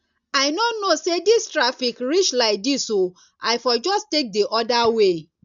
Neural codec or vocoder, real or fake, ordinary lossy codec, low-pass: none; real; none; 7.2 kHz